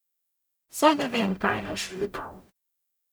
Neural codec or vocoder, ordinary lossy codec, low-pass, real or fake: codec, 44.1 kHz, 0.9 kbps, DAC; none; none; fake